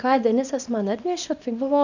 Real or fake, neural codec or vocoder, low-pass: fake; codec, 24 kHz, 0.9 kbps, WavTokenizer, small release; 7.2 kHz